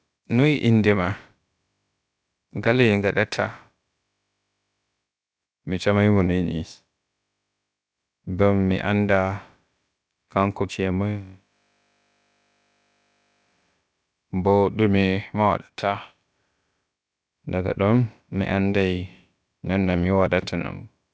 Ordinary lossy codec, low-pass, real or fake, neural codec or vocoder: none; none; fake; codec, 16 kHz, about 1 kbps, DyCAST, with the encoder's durations